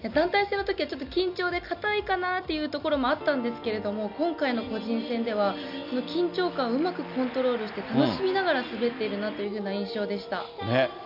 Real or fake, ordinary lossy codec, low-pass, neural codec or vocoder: real; none; 5.4 kHz; none